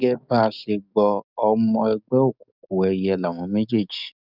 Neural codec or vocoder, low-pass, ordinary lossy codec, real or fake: codec, 44.1 kHz, 7.8 kbps, DAC; 5.4 kHz; none; fake